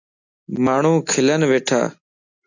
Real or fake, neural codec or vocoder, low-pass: real; none; 7.2 kHz